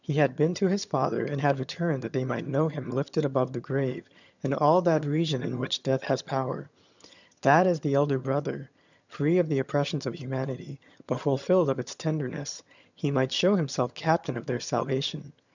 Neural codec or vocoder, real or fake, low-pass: vocoder, 22.05 kHz, 80 mel bands, HiFi-GAN; fake; 7.2 kHz